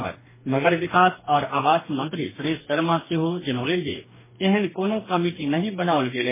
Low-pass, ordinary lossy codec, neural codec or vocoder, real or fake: 3.6 kHz; MP3, 16 kbps; codec, 44.1 kHz, 2.6 kbps, DAC; fake